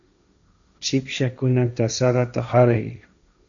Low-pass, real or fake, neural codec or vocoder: 7.2 kHz; fake; codec, 16 kHz, 1.1 kbps, Voila-Tokenizer